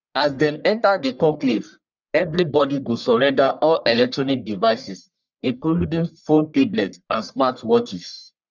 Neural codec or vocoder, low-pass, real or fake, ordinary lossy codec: codec, 44.1 kHz, 1.7 kbps, Pupu-Codec; 7.2 kHz; fake; none